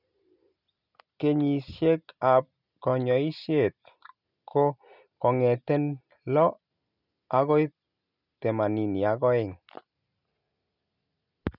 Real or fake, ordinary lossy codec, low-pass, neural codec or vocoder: real; none; 5.4 kHz; none